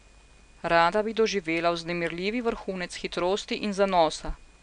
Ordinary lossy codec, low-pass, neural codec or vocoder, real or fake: MP3, 96 kbps; 9.9 kHz; none; real